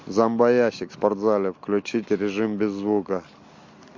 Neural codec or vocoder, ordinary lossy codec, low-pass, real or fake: none; MP3, 48 kbps; 7.2 kHz; real